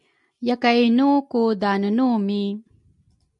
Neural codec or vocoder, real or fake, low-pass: none; real; 10.8 kHz